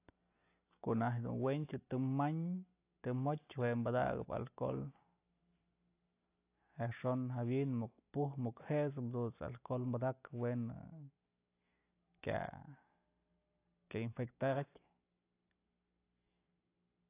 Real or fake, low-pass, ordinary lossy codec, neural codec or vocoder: real; 3.6 kHz; AAC, 24 kbps; none